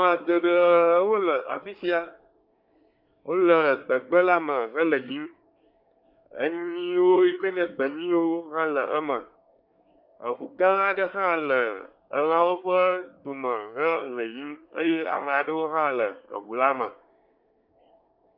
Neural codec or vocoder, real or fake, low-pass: codec, 24 kHz, 1 kbps, SNAC; fake; 5.4 kHz